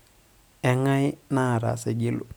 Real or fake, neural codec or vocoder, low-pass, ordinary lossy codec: real; none; none; none